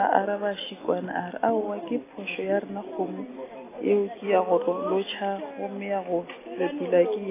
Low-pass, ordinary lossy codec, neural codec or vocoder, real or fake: 3.6 kHz; AAC, 16 kbps; none; real